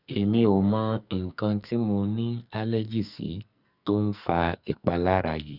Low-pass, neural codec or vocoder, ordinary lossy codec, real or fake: 5.4 kHz; codec, 44.1 kHz, 2.6 kbps, SNAC; none; fake